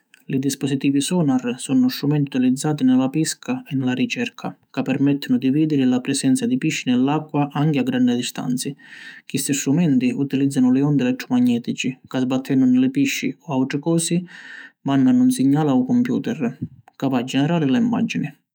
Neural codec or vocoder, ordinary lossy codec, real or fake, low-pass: autoencoder, 48 kHz, 128 numbers a frame, DAC-VAE, trained on Japanese speech; none; fake; none